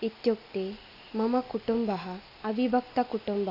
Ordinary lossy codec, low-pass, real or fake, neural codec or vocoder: AAC, 32 kbps; 5.4 kHz; real; none